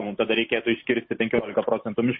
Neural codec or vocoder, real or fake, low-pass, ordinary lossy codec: none; real; 7.2 kHz; MP3, 24 kbps